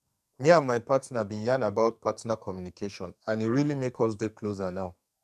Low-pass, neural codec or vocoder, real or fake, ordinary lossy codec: 14.4 kHz; codec, 44.1 kHz, 2.6 kbps, SNAC; fake; none